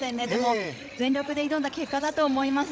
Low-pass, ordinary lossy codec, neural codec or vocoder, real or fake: none; none; codec, 16 kHz, 16 kbps, FreqCodec, larger model; fake